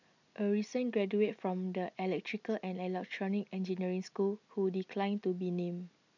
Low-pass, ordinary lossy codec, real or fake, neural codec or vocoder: 7.2 kHz; none; real; none